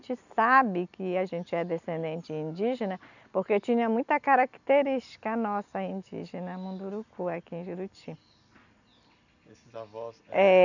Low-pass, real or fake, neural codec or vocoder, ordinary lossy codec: 7.2 kHz; real; none; none